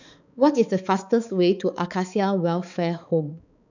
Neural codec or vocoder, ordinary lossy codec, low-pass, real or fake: codec, 16 kHz, 4 kbps, X-Codec, HuBERT features, trained on balanced general audio; none; 7.2 kHz; fake